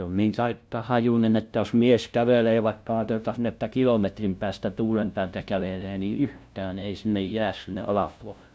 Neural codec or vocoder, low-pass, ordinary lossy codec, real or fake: codec, 16 kHz, 0.5 kbps, FunCodec, trained on LibriTTS, 25 frames a second; none; none; fake